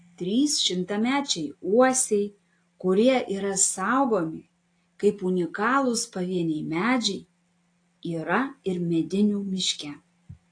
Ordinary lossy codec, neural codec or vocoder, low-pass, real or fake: AAC, 48 kbps; none; 9.9 kHz; real